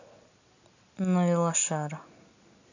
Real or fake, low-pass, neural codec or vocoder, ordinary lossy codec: real; 7.2 kHz; none; none